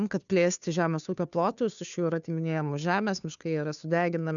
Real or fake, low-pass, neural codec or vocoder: fake; 7.2 kHz; codec, 16 kHz, 2 kbps, FunCodec, trained on Chinese and English, 25 frames a second